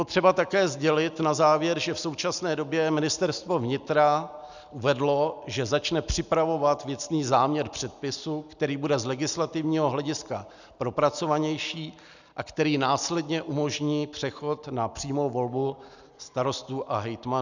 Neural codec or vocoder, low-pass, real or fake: none; 7.2 kHz; real